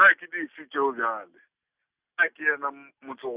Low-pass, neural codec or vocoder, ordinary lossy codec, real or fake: 3.6 kHz; none; Opus, 64 kbps; real